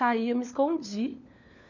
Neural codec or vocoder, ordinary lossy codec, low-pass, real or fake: codec, 16 kHz, 4 kbps, FunCodec, trained on LibriTTS, 50 frames a second; none; 7.2 kHz; fake